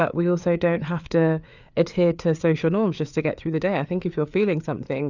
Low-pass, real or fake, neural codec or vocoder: 7.2 kHz; fake; codec, 16 kHz, 4 kbps, FreqCodec, larger model